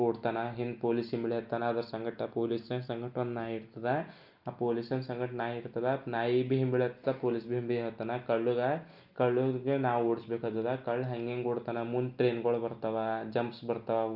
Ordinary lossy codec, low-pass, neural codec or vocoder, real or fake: Opus, 24 kbps; 5.4 kHz; none; real